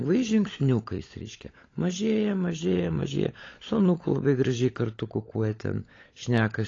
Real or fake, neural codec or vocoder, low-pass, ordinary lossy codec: fake; codec, 16 kHz, 16 kbps, FunCodec, trained on LibriTTS, 50 frames a second; 7.2 kHz; AAC, 32 kbps